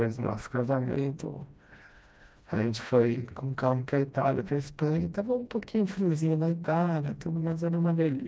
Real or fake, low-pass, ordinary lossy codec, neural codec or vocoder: fake; none; none; codec, 16 kHz, 1 kbps, FreqCodec, smaller model